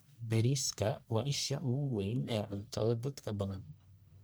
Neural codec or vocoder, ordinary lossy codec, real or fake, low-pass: codec, 44.1 kHz, 1.7 kbps, Pupu-Codec; none; fake; none